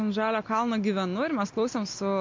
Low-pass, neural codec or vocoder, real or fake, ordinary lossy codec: 7.2 kHz; none; real; MP3, 48 kbps